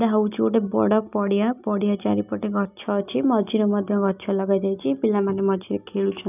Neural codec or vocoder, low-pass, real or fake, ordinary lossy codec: none; 3.6 kHz; real; none